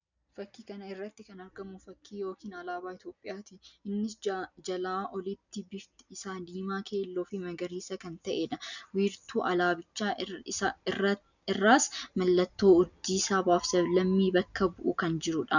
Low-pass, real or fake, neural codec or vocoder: 7.2 kHz; real; none